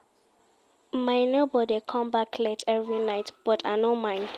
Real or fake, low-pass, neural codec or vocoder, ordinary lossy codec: real; 14.4 kHz; none; Opus, 24 kbps